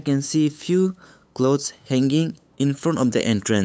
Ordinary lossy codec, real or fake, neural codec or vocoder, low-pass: none; fake; codec, 16 kHz, 8 kbps, FunCodec, trained on LibriTTS, 25 frames a second; none